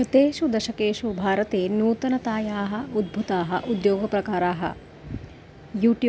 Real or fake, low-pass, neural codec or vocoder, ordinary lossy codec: real; none; none; none